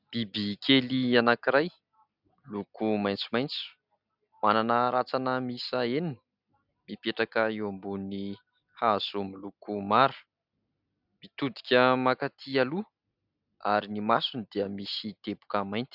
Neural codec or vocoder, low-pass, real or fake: none; 5.4 kHz; real